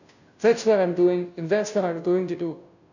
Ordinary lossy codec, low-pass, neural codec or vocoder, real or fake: none; 7.2 kHz; codec, 16 kHz, 0.5 kbps, FunCodec, trained on Chinese and English, 25 frames a second; fake